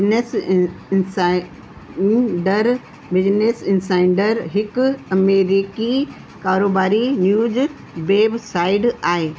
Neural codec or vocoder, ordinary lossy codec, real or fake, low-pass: none; none; real; none